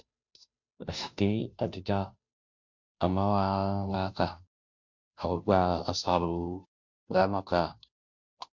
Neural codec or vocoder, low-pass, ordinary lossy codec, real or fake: codec, 16 kHz, 0.5 kbps, FunCodec, trained on Chinese and English, 25 frames a second; 7.2 kHz; MP3, 64 kbps; fake